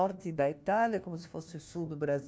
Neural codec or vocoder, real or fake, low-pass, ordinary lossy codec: codec, 16 kHz, 1 kbps, FunCodec, trained on LibriTTS, 50 frames a second; fake; none; none